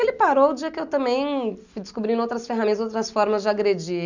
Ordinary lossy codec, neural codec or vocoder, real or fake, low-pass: none; none; real; 7.2 kHz